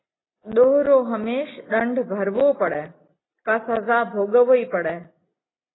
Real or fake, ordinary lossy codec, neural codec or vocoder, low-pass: real; AAC, 16 kbps; none; 7.2 kHz